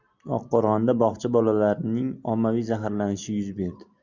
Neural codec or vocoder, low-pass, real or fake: none; 7.2 kHz; real